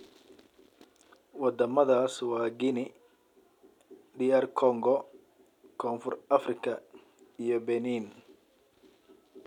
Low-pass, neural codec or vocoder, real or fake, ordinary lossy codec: 19.8 kHz; none; real; none